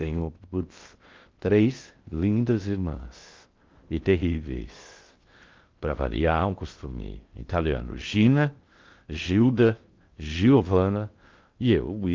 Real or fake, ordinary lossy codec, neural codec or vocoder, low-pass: fake; Opus, 24 kbps; codec, 16 kHz in and 24 kHz out, 0.6 kbps, FocalCodec, streaming, 2048 codes; 7.2 kHz